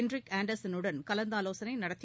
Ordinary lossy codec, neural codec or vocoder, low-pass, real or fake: none; none; none; real